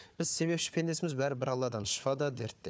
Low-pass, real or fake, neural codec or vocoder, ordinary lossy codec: none; fake; codec, 16 kHz, 4 kbps, FunCodec, trained on Chinese and English, 50 frames a second; none